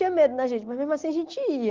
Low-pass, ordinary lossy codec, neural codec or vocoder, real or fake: 7.2 kHz; Opus, 16 kbps; none; real